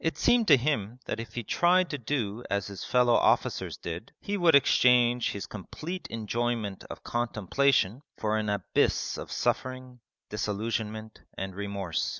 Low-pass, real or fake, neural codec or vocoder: 7.2 kHz; real; none